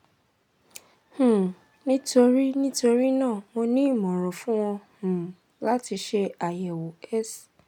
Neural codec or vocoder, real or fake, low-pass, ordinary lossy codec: none; real; 19.8 kHz; none